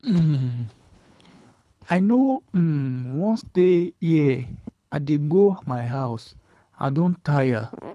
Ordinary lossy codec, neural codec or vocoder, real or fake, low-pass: none; codec, 24 kHz, 3 kbps, HILCodec; fake; none